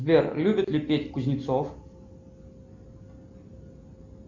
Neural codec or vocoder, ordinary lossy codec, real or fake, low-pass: none; MP3, 64 kbps; real; 7.2 kHz